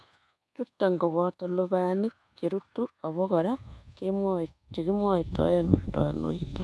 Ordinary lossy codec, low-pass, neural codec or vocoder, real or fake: none; none; codec, 24 kHz, 1.2 kbps, DualCodec; fake